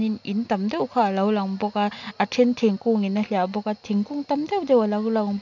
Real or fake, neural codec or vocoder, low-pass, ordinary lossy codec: real; none; 7.2 kHz; none